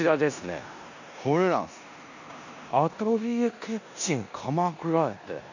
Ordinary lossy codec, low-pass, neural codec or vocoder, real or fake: none; 7.2 kHz; codec, 16 kHz in and 24 kHz out, 0.9 kbps, LongCat-Audio-Codec, four codebook decoder; fake